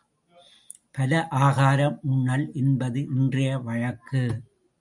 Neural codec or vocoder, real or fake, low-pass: none; real; 10.8 kHz